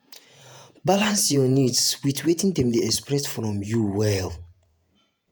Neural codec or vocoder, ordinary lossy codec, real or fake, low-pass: vocoder, 48 kHz, 128 mel bands, Vocos; none; fake; none